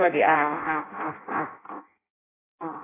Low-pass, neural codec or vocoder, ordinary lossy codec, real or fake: 3.6 kHz; codec, 16 kHz in and 24 kHz out, 0.6 kbps, FireRedTTS-2 codec; AAC, 16 kbps; fake